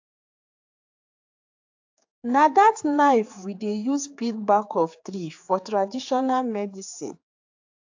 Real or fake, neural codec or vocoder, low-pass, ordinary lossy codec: fake; codec, 16 kHz, 4 kbps, X-Codec, HuBERT features, trained on general audio; 7.2 kHz; AAC, 48 kbps